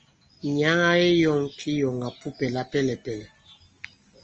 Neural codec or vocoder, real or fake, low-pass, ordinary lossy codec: none; real; 7.2 kHz; Opus, 24 kbps